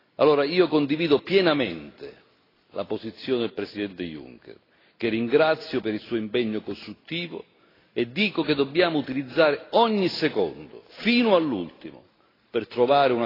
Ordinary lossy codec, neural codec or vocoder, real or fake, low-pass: AAC, 24 kbps; none; real; 5.4 kHz